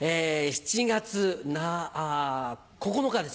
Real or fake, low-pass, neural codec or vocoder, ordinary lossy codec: real; none; none; none